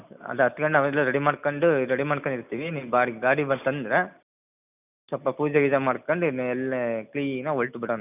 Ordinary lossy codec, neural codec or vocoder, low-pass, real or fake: none; none; 3.6 kHz; real